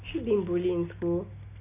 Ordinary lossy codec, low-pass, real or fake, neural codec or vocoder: none; 3.6 kHz; real; none